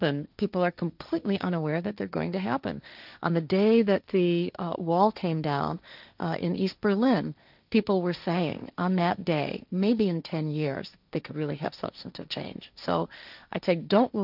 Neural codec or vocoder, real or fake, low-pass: codec, 16 kHz, 1.1 kbps, Voila-Tokenizer; fake; 5.4 kHz